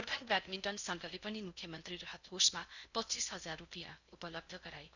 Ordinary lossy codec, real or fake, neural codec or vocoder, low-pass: none; fake; codec, 16 kHz in and 24 kHz out, 0.6 kbps, FocalCodec, streaming, 4096 codes; 7.2 kHz